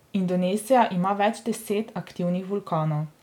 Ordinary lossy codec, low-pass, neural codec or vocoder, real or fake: none; 19.8 kHz; none; real